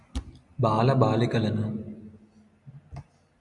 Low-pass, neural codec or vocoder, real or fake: 10.8 kHz; none; real